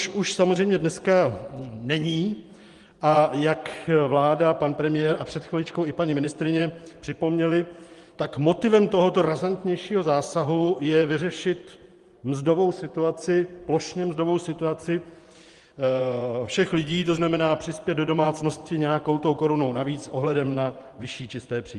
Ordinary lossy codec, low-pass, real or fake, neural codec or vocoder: Opus, 24 kbps; 10.8 kHz; fake; vocoder, 24 kHz, 100 mel bands, Vocos